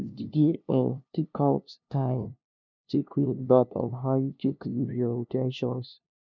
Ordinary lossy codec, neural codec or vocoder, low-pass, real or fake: none; codec, 16 kHz, 0.5 kbps, FunCodec, trained on LibriTTS, 25 frames a second; 7.2 kHz; fake